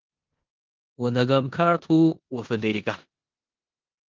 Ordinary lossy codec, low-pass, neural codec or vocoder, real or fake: Opus, 32 kbps; 7.2 kHz; codec, 16 kHz in and 24 kHz out, 0.9 kbps, LongCat-Audio-Codec, four codebook decoder; fake